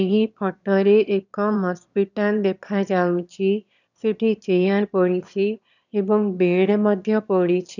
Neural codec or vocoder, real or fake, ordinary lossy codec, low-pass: autoencoder, 22.05 kHz, a latent of 192 numbers a frame, VITS, trained on one speaker; fake; none; 7.2 kHz